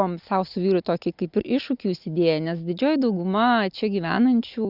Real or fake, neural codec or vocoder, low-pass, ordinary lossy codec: real; none; 5.4 kHz; Opus, 64 kbps